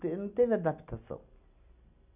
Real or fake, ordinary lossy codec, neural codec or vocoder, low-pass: real; none; none; 3.6 kHz